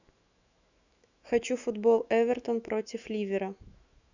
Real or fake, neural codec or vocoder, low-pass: fake; autoencoder, 48 kHz, 128 numbers a frame, DAC-VAE, trained on Japanese speech; 7.2 kHz